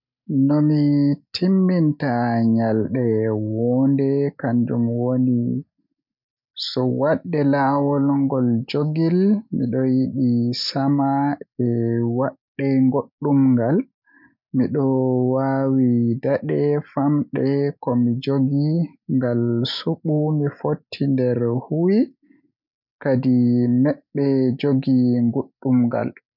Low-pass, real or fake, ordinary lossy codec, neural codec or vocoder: 5.4 kHz; real; none; none